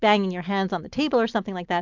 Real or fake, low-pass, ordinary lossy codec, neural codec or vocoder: real; 7.2 kHz; MP3, 64 kbps; none